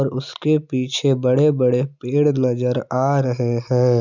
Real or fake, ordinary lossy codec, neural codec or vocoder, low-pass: real; none; none; 7.2 kHz